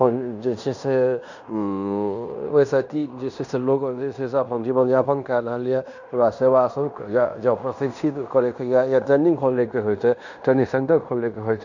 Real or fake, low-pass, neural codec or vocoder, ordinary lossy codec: fake; 7.2 kHz; codec, 16 kHz in and 24 kHz out, 0.9 kbps, LongCat-Audio-Codec, fine tuned four codebook decoder; none